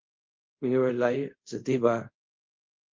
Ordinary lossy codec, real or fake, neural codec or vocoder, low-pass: Opus, 32 kbps; fake; codec, 24 kHz, 0.5 kbps, DualCodec; 7.2 kHz